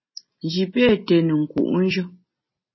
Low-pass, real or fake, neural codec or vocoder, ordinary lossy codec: 7.2 kHz; real; none; MP3, 24 kbps